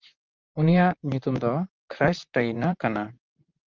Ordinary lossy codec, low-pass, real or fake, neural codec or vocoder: Opus, 24 kbps; 7.2 kHz; fake; vocoder, 44.1 kHz, 128 mel bands, Pupu-Vocoder